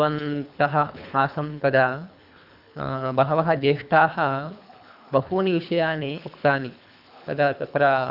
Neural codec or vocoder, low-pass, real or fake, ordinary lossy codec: codec, 24 kHz, 3 kbps, HILCodec; 5.4 kHz; fake; none